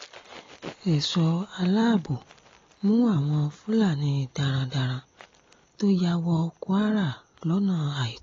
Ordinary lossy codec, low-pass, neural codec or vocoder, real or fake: AAC, 32 kbps; 7.2 kHz; none; real